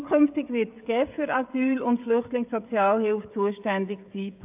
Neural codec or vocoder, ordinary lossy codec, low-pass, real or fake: codec, 16 kHz, 16 kbps, FreqCodec, smaller model; AAC, 32 kbps; 3.6 kHz; fake